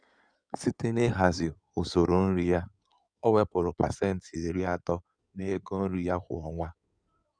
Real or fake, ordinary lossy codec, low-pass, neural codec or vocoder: fake; none; 9.9 kHz; codec, 16 kHz in and 24 kHz out, 2.2 kbps, FireRedTTS-2 codec